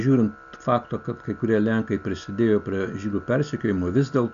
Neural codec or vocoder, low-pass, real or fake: none; 7.2 kHz; real